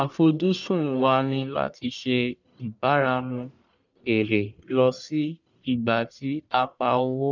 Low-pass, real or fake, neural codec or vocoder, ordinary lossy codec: 7.2 kHz; fake; codec, 44.1 kHz, 1.7 kbps, Pupu-Codec; none